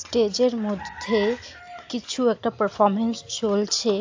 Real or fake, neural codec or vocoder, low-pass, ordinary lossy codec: real; none; 7.2 kHz; AAC, 48 kbps